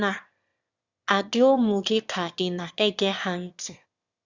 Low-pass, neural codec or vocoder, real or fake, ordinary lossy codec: 7.2 kHz; autoencoder, 22.05 kHz, a latent of 192 numbers a frame, VITS, trained on one speaker; fake; Opus, 64 kbps